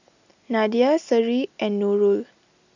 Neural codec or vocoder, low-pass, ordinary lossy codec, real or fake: none; 7.2 kHz; none; real